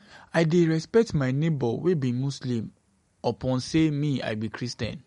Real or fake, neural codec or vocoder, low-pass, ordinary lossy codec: real; none; 14.4 kHz; MP3, 48 kbps